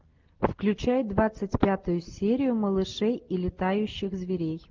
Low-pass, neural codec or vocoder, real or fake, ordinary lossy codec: 7.2 kHz; none; real; Opus, 32 kbps